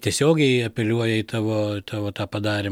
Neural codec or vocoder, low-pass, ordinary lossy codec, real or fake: none; 19.8 kHz; MP3, 96 kbps; real